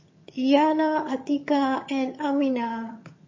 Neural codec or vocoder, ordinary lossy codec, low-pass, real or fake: vocoder, 22.05 kHz, 80 mel bands, HiFi-GAN; MP3, 32 kbps; 7.2 kHz; fake